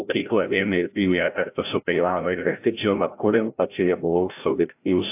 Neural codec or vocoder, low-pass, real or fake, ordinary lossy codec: codec, 16 kHz, 0.5 kbps, FreqCodec, larger model; 3.6 kHz; fake; AAC, 32 kbps